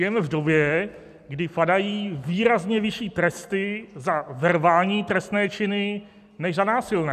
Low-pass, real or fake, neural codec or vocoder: 14.4 kHz; real; none